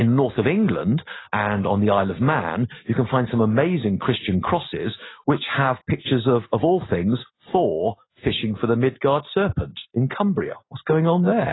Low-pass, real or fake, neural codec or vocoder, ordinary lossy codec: 7.2 kHz; real; none; AAC, 16 kbps